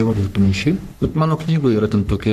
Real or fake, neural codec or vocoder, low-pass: fake; codec, 44.1 kHz, 3.4 kbps, Pupu-Codec; 14.4 kHz